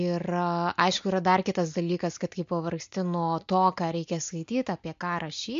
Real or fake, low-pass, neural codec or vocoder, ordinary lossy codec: real; 7.2 kHz; none; MP3, 48 kbps